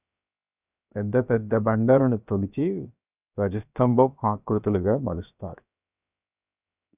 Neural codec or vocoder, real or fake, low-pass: codec, 16 kHz, 0.7 kbps, FocalCodec; fake; 3.6 kHz